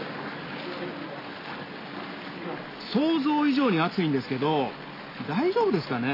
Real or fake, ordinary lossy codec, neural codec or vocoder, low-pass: real; AAC, 32 kbps; none; 5.4 kHz